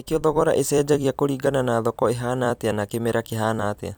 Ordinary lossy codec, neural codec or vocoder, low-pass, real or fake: none; vocoder, 44.1 kHz, 128 mel bands every 256 samples, BigVGAN v2; none; fake